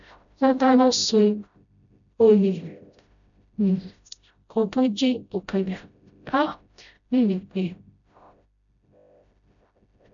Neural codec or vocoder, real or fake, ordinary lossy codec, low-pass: codec, 16 kHz, 0.5 kbps, FreqCodec, smaller model; fake; MP3, 96 kbps; 7.2 kHz